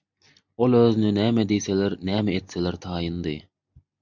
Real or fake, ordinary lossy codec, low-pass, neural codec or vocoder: real; MP3, 64 kbps; 7.2 kHz; none